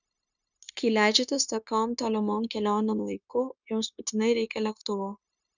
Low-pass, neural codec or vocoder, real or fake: 7.2 kHz; codec, 16 kHz, 0.9 kbps, LongCat-Audio-Codec; fake